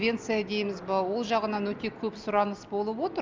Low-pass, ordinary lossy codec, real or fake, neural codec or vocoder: 7.2 kHz; Opus, 24 kbps; real; none